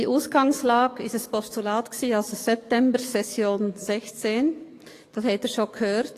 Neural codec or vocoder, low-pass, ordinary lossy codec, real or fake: codec, 44.1 kHz, 7.8 kbps, DAC; 14.4 kHz; AAC, 48 kbps; fake